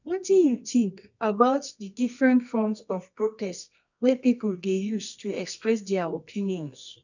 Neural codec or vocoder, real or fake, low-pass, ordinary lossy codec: codec, 24 kHz, 0.9 kbps, WavTokenizer, medium music audio release; fake; 7.2 kHz; none